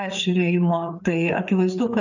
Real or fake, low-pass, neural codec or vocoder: fake; 7.2 kHz; codec, 16 kHz, 4 kbps, FreqCodec, larger model